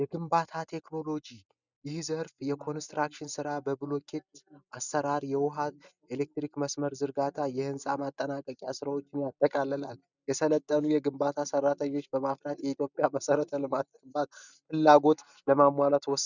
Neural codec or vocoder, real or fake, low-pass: none; real; 7.2 kHz